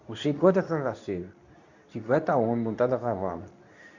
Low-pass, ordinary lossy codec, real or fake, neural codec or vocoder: 7.2 kHz; none; fake; codec, 24 kHz, 0.9 kbps, WavTokenizer, medium speech release version 2